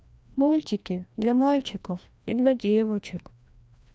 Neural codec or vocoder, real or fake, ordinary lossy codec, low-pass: codec, 16 kHz, 1 kbps, FreqCodec, larger model; fake; none; none